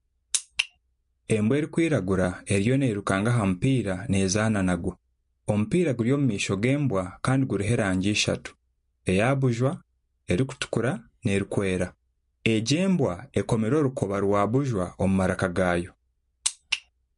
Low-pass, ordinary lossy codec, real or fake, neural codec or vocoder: 10.8 kHz; MP3, 48 kbps; real; none